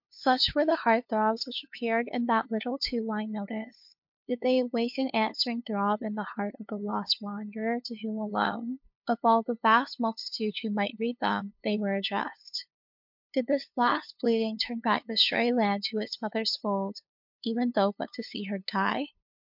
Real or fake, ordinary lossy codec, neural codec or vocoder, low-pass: fake; MP3, 48 kbps; codec, 16 kHz, 2 kbps, FunCodec, trained on LibriTTS, 25 frames a second; 5.4 kHz